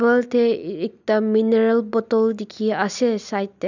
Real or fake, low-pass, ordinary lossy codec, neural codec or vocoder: real; 7.2 kHz; none; none